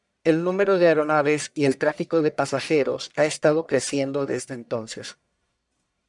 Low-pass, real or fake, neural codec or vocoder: 10.8 kHz; fake; codec, 44.1 kHz, 1.7 kbps, Pupu-Codec